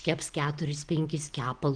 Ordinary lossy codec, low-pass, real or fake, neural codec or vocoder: Opus, 16 kbps; 9.9 kHz; real; none